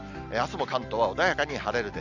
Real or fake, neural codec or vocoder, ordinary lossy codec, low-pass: real; none; none; 7.2 kHz